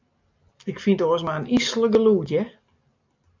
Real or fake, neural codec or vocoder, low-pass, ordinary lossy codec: real; none; 7.2 kHz; MP3, 64 kbps